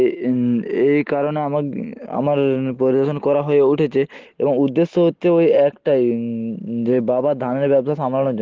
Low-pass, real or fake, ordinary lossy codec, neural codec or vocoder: 7.2 kHz; real; Opus, 16 kbps; none